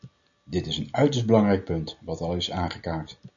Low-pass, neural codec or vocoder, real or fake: 7.2 kHz; none; real